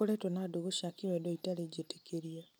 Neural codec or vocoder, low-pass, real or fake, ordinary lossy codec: none; none; real; none